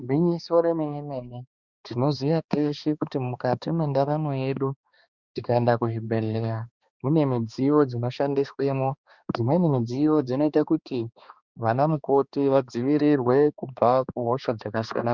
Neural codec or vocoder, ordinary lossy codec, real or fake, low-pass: codec, 16 kHz, 2 kbps, X-Codec, HuBERT features, trained on balanced general audio; Opus, 64 kbps; fake; 7.2 kHz